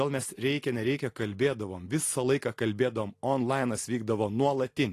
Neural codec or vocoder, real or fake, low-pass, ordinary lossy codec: none; real; 14.4 kHz; AAC, 48 kbps